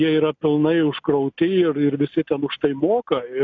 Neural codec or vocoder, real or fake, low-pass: none; real; 7.2 kHz